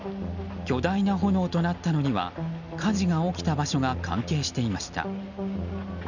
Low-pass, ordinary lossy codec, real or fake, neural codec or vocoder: 7.2 kHz; none; real; none